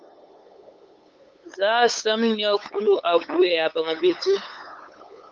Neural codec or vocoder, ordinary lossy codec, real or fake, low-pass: codec, 16 kHz, 8 kbps, FunCodec, trained on LibriTTS, 25 frames a second; Opus, 24 kbps; fake; 7.2 kHz